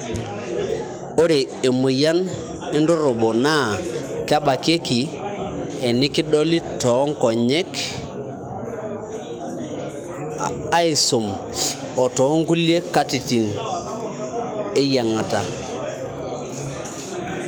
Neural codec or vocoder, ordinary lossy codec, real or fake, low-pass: codec, 44.1 kHz, 7.8 kbps, DAC; none; fake; none